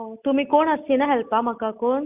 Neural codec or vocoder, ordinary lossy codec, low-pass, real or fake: none; Opus, 64 kbps; 3.6 kHz; real